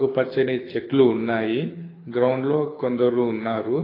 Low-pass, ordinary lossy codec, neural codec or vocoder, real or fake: 5.4 kHz; AAC, 24 kbps; codec, 24 kHz, 6 kbps, HILCodec; fake